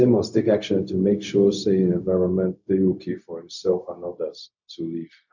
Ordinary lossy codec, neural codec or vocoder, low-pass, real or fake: none; codec, 16 kHz, 0.4 kbps, LongCat-Audio-Codec; 7.2 kHz; fake